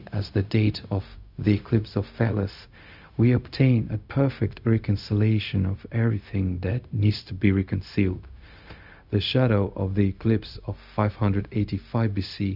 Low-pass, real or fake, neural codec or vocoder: 5.4 kHz; fake; codec, 16 kHz, 0.4 kbps, LongCat-Audio-Codec